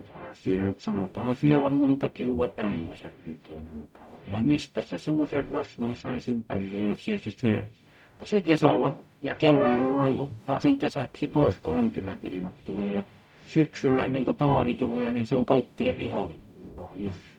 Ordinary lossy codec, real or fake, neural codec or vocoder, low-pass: none; fake; codec, 44.1 kHz, 0.9 kbps, DAC; 19.8 kHz